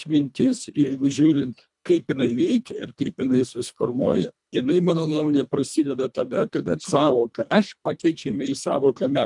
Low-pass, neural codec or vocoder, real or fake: 10.8 kHz; codec, 24 kHz, 1.5 kbps, HILCodec; fake